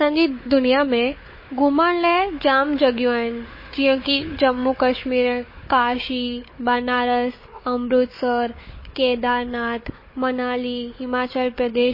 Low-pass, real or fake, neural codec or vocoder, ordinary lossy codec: 5.4 kHz; fake; codec, 16 kHz, 4 kbps, FunCodec, trained on Chinese and English, 50 frames a second; MP3, 24 kbps